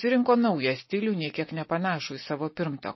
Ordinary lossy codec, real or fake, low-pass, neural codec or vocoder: MP3, 24 kbps; real; 7.2 kHz; none